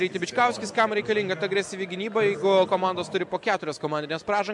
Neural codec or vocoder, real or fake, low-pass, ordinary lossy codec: none; real; 10.8 kHz; MP3, 96 kbps